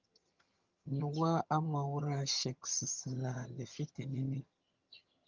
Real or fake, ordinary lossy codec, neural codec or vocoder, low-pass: fake; Opus, 24 kbps; vocoder, 22.05 kHz, 80 mel bands, HiFi-GAN; 7.2 kHz